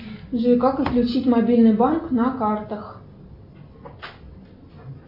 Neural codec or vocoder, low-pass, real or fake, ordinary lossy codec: none; 5.4 kHz; real; AAC, 48 kbps